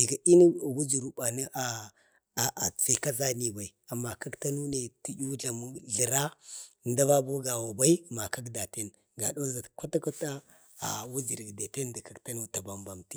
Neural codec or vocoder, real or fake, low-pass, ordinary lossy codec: none; real; none; none